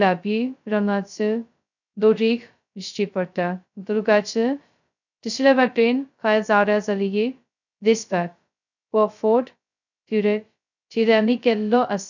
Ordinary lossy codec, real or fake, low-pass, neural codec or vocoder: none; fake; 7.2 kHz; codec, 16 kHz, 0.2 kbps, FocalCodec